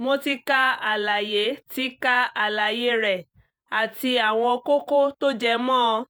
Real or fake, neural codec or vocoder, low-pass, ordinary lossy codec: fake; vocoder, 48 kHz, 128 mel bands, Vocos; none; none